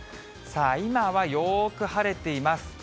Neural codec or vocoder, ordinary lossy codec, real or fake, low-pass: none; none; real; none